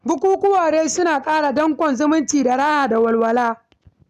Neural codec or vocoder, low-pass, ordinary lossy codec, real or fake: none; 14.4 kHz; none; real